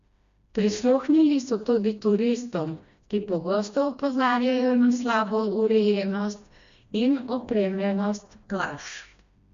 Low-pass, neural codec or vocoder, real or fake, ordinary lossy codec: 7.2 kHz; codec, 16 kHz, 1 kbps, FreqCodec, smaller model; fake; Opus, 64 kbps